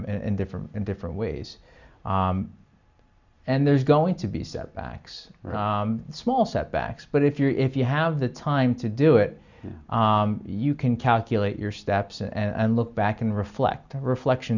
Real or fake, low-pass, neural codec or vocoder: real; 7.2 kHz; none